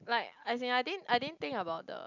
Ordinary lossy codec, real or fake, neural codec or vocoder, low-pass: none; real; none; 7.2 kHz